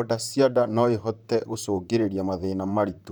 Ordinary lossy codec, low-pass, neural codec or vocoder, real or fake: none; none; vocoder, 44.1 kHz, 128 mel bands, Pupu-Vocoder; fake